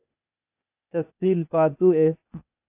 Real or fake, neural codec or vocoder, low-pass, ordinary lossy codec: fake; codec, 16 kHz, 0.8 kbps, ZipCodec; 3.6 kHz; AAC, 32 kbps